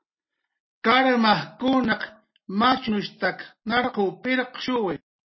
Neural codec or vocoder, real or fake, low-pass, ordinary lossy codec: none; real; 7.2 kHz; MP3, 24 kbps